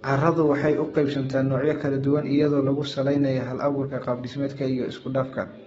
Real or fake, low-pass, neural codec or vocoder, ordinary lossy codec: fake; 19.8 kHz; codec, 44.1 kHz, 7.8 kbps, DAC; AAC, 24 kbps